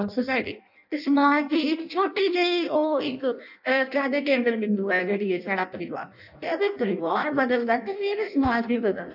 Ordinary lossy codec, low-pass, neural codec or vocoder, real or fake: none; 5.4 kHz; codec, 16 kHz in and 24 kHz out, 0.6 kbps, FireRedTTS-2 codec; fake